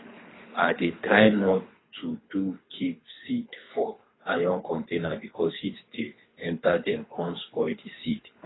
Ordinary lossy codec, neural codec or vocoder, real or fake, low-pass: AAC, 16 kbps; codec, 24 kHz, 3 kbps, HILCodec; fake; 7.2 kHz